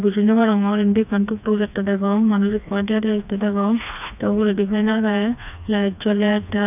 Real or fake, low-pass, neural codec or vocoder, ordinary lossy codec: fake; 3.6 kHz; codec, 16 kHz, 2 kbps, FreqCodec, smaller model; none